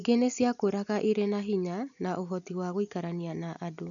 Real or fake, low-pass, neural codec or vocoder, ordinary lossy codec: real; 7.2 kHz; none; none